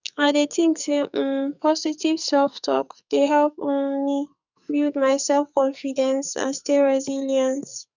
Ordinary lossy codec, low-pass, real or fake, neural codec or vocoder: none; 7.2 kHz; fake; codec, 44.1 kHz, 2.6 kbps, SNAC